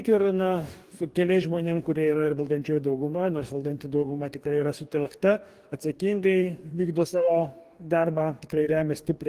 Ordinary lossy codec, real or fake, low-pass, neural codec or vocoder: Opus, 24 kbps; fake; 14.4 kHz; codec, 44.1 kHz, 2.6 kbps, DAC